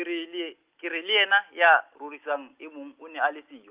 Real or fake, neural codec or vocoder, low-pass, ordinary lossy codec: real; none; 3.6 kHz; Opus, 32 kbps